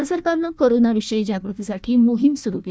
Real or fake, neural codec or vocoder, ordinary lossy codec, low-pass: fake; codec, 16 kHz, 1 kbps, FunCodec, trained on Chinese and English, 50 frames a second; none; none